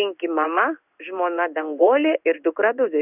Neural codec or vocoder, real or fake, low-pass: codec, 16 kHz in and 24 kHz out, 1 kbps, XY-Tokenizer; fake; 3.6 kHz